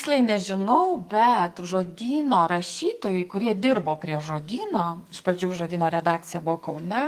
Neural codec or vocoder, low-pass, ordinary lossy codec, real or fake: codec, 44.1 kHz, 2.6 kbps, SNAC; 14.4 kHz; Opus, 24 kbps; fake